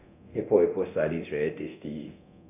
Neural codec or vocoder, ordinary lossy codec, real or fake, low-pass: codec, 24 kHz, 0.9 kbps, DualCodec; AAC, 32 kbps; fake; 3.6 kHz